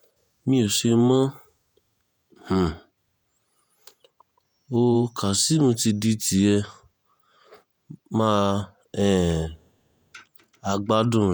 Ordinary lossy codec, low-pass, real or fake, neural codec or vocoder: none; none; fake; vocoder, 48 kHz, 128 mel bands, Vocos